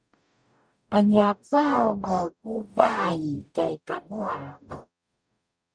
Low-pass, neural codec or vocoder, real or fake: 9.9 kHz; codec, 44.1 kHz, 0.9 kbps, DAC; fake